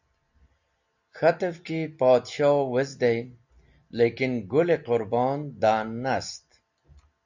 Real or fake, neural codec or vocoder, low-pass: real; none; 7.2 kHz